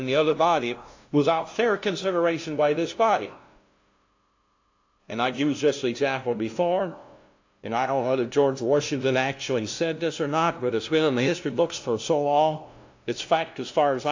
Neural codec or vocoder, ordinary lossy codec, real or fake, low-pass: codec, 16 kHz, 0.5 kbps, FunCodec, trained on LibriTTS, 25 frames a second; AAC, 48 kbps; fake; 7.2 kHz